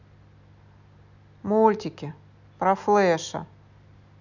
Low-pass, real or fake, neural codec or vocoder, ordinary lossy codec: 7.2 kHz; real; none; none